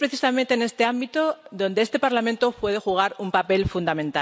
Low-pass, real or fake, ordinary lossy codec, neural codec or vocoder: none; real; none; none